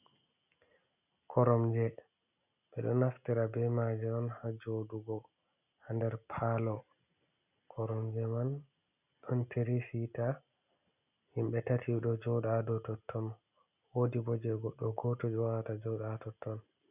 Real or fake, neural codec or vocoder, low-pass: real; none; 3.6 kHz